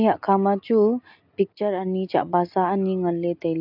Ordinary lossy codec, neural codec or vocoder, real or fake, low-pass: AAC, 48 kbps; none; real; 5.4 kHz